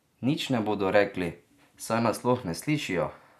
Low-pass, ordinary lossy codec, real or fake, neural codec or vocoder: 14.4 kHz; none; real; none